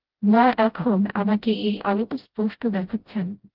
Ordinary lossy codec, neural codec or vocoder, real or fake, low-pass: Opus, 16 kbps; codec, 16 kHz, 0.5 kbps, FreqCodec, smaller model; fake; 5.4 kHz